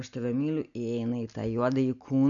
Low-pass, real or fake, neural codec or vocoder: 7.2 kHz; real; none